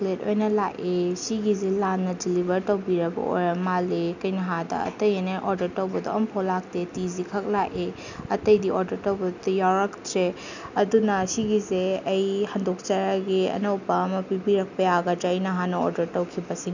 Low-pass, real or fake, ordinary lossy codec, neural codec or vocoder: 7.2 kHz; real; none; none